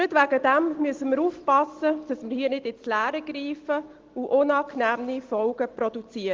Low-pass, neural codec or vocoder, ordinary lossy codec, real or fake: 7.2 kHz; none; Opus, 16 kbps; real